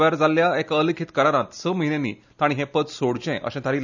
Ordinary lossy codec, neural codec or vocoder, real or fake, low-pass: none; none; real; 7.2 kHz